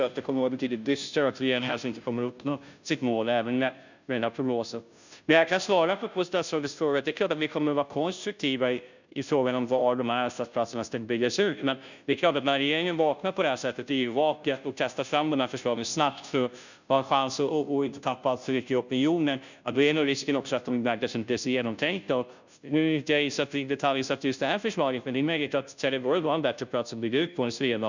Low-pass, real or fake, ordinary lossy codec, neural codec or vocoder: 7.2 kHz; fake; none; codec, 16 kHz, 0.5 kbps, FunCodec, trained on Chinese and English, 25 frames a second